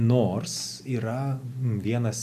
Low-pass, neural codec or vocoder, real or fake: 14.4 kHz; none; real